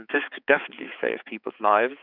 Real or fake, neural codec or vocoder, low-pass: fake; codec, 16 kHz, 4 kbps, X-Codec, HuBERT features, trained on balanced general audio; 5.4 kHz